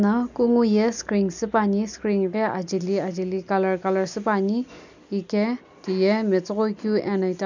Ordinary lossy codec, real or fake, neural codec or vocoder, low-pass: none; real; none; 7.2 kHz